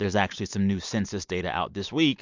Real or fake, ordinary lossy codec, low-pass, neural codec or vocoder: real; MP3, 64 kbps; 7.2 kHz; none